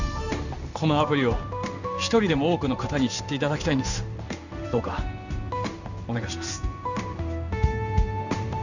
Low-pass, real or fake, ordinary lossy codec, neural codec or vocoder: 7.2 kHz; fake; none; codec, 16 kHz in and 24 kHz out, 1 kbps, XY-Tokenizer